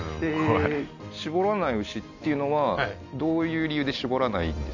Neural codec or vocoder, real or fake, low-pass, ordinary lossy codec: none; real; 7.2 kHz; none